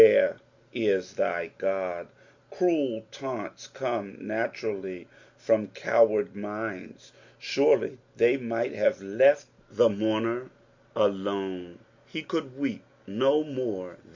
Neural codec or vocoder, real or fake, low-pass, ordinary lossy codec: none; real; 7.2 kHz; Opus, 64 kbps